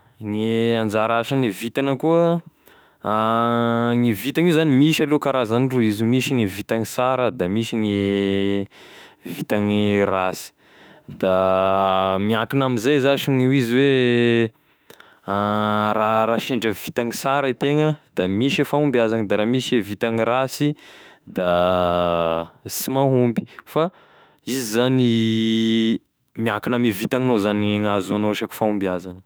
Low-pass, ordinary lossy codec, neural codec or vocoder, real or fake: none; none; autoencoder, 48 kHz, 32 numbers a frame, DAC-VAE, trained on Japanese speech; fake